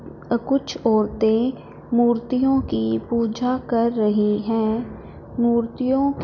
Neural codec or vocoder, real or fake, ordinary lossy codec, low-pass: none; real; none; 7.2 kHz